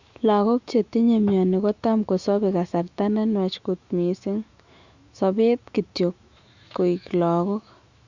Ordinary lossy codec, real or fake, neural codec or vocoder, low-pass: Opus, 64 kbps; fake; autoencoder, 48 kHz, 128 numbers a frame, DAC-VAE, trained on Japanese speech; 7.2 kHz